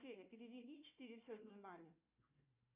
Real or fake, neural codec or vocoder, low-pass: fake; codec, 16 kHz, 1 kbps, FunCodec, trained on Chinese and English, 50 frames a second; 3.6 kHz